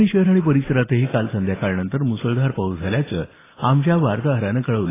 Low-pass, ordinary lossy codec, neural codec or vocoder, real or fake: 3.6 kHz; AAC, 16 kbps; none; real